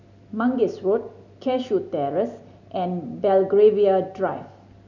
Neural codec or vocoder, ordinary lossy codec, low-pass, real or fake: none; none; 7.2 kHz; real